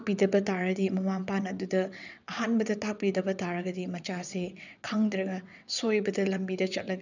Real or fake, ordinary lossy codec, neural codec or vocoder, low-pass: fake; none; vocoder, 22.05 kHz, 80 mel bands, WaveNeXt; 7.2 kHz